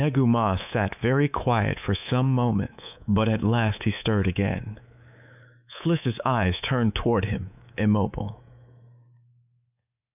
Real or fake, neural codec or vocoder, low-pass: fake; codec, 24 kHz, 3.1 kbps, DualCodec; 3.6 kHz